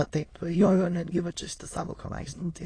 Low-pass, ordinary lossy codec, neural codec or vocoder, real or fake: 9.9 kHz; AAC, 32 kbps; autoencoder, 22.05 kHz, a latent of 192 numbers a frame, VITS, trained on many speakers; fake